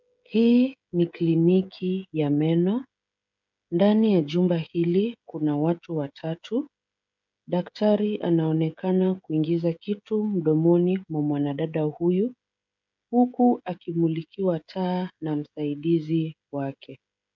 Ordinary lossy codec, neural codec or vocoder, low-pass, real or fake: MP3, 64 kbps; codec, 16 kHz, 16 kbps, FreqCodec, smaller model; 7.2 kHz; fake